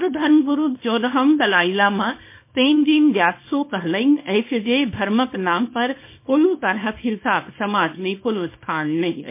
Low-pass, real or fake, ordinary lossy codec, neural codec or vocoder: 3.6 kHz; fake; MP3, 24 kbps; codec, 24 kHz, 0.9 kbps, WavTokenizer, small release